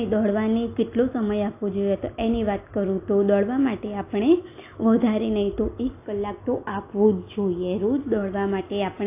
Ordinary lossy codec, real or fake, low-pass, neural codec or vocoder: AAC, 24 kbps; real; 3.6 kHz; none